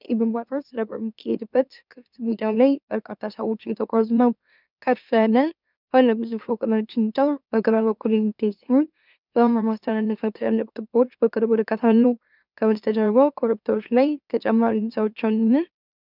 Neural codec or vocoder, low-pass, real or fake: autoencoder, 44.1 kHz, a latent of 192 numbers a frame, MeloTTS; 5.4 kHz; fake